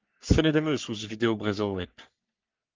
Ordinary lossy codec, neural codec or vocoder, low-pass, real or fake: Opus, 24 kbps; codec, 44.1 kHz, 3.4 kbps, Pupu-Codec; 7.2 kHz; fake